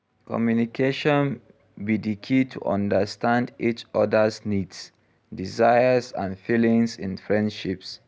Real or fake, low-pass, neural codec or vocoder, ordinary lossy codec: real; none; none; none